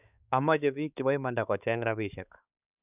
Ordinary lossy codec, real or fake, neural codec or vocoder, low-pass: none; fake; codec, 16 kHz, 4 kbps, X-Codec, HuBERT features, trained on balanced general audio; 3.6 kHz